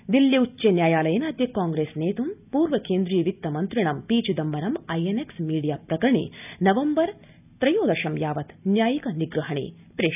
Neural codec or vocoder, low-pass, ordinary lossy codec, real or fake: none; 3.6 kHz; none; real